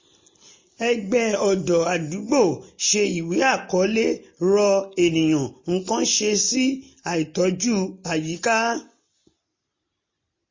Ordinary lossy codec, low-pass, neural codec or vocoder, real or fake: MP3, 32 kbps; 7.2 kHz; none; real